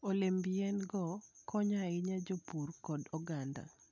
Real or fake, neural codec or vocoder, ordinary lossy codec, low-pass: real; none; none; 7.2 kHz